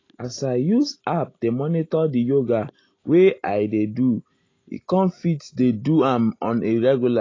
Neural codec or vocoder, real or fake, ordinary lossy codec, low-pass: none; real; AAC, 32 kbps; 7.2 kHz